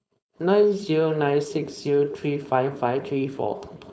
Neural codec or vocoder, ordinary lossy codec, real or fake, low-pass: codec, 16 kHz, 4.8 kbps, FACodec; none; fake; none